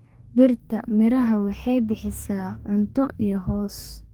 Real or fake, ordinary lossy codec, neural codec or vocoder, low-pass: fake; Opus, 24 kbps; codec, 44.1 kHz, 2.6 kbps, DAC; 19.8 kHz